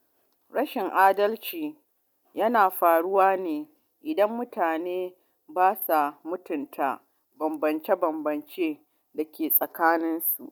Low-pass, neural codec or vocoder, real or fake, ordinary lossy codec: none; none; real; none